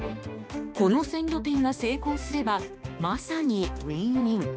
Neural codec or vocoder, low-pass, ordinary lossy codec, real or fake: codec, 16 kHz, 2 kbps, X-Codec, HuBERT features, trained on balanced general audio; none; none; fake